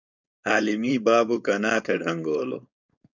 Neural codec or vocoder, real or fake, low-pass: codec, 16 kHz, 4.8 kbps, FACodec; fake; 7.2 kHz